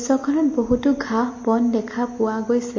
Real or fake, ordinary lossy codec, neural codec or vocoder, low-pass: real; MP3, 32 kbps; none; 7.2 kHz